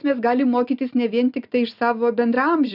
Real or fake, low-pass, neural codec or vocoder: real; 5.4 kHz; none